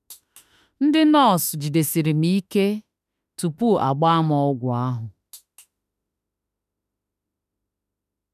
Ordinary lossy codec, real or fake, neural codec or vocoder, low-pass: none; fake; autoencoder, 48 kHz, 32 numbers a frame, DAC-VAE, trained on Japanese speech; 14.4 kHz